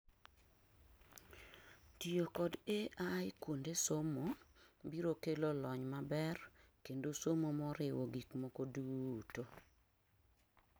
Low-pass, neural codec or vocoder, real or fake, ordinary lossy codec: none; none; real; none